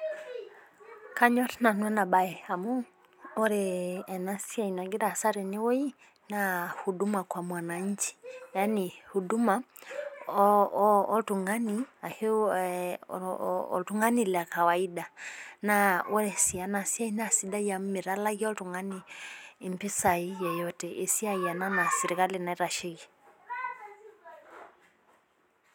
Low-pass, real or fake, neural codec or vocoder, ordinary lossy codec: none; real; none; none